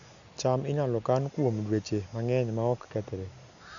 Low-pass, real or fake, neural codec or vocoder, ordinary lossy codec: 7.2 kHz; real; none; none